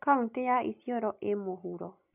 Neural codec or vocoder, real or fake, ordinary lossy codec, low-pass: none; real; none; 3.6 kHz